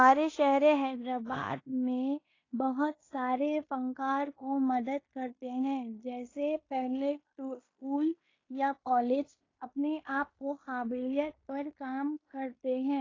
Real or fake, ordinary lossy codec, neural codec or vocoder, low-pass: fake; AAC, 32 kbps; codec, 16 kHz in and 24 kHz out, 0.9 kbps, LongCat-Audio-Codec, fine tuned four codebook decoder; 7.2 kHz